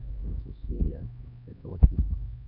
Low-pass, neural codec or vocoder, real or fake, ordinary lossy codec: 5.4 kHz; codec, 16 kHz, 2 kbps, X-Codec, HuBERT features, trained on LibriSpeech; fake; none